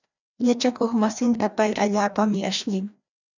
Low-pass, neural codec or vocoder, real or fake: 7.2 kHz; codec, 16 kHz, 1 kbps, FreqCodec, larger model; fake